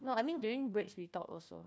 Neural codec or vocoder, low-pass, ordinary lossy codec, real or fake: codec, 16 kHz, 1 kbps, FunCodec, trained on Chinese and English, 50 frames a second; none; none; fake